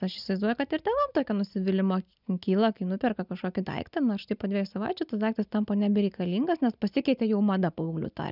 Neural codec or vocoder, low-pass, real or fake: none; 5.4 kHz; real